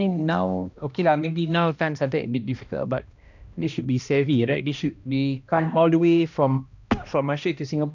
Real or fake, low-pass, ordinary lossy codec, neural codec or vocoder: fake; 7.2 kHz; none; codec, 16 kHz, 1 kbps, X-Codec, HuBERT features, trained on balanced general audio